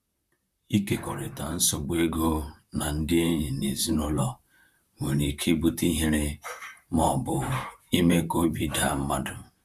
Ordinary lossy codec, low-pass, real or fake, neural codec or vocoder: none; 14.4 kHz; fake; vocoder, 44.1 kHz, 128 mel bands, Pupu-Vocoder